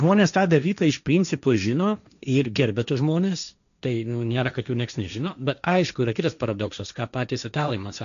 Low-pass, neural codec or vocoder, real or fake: 7.2 kHz; codec, 16 kHz, 1.1 kbps, Voila-Tokenizer; fake